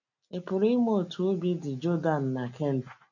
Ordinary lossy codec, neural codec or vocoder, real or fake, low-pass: none; none; real; 7.2 kHz